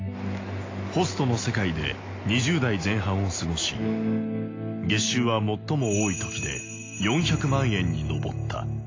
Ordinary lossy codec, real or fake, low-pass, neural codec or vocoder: AAC, 32 kbps; real; 7.2 kHz; none